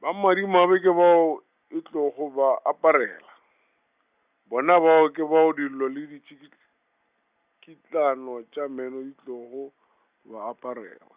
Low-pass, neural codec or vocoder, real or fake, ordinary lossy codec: 3.6 kHz; none; real; Opus, 64 kbps